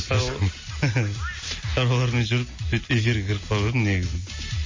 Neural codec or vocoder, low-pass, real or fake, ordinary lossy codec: none; 7.2 kHz; real; MP3, 32 kbps